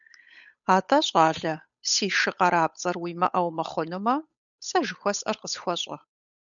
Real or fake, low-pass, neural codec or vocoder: fake; 7.2 kHz; codec, 16 kHz, 8 kbps, FunCodec, trained on Chinese and English, 25 frames a second